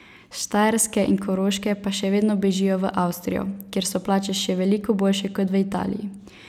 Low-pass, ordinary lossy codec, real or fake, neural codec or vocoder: 19.8 kHz; none; real; none